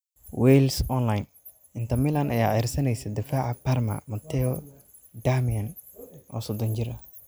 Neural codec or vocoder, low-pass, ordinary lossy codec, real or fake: none; none; none; real